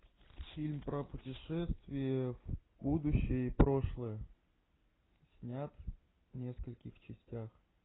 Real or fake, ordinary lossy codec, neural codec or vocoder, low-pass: real; AAC, 16 kbps; none; 7.2 kHz